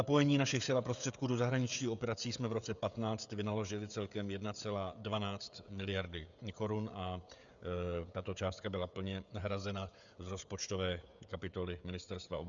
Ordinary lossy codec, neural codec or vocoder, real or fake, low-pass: MP3, 96 kbps; codec, 16 kHz, 16 kbps, FreqCodec, smaller model; fake; 7.2 kHz